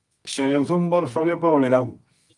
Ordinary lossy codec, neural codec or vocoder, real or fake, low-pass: Opus, 32 kbps; codec, 24 kHz, 0.9 kbps, WavTokenizer, medium music audio release; fake; 10.8 kHz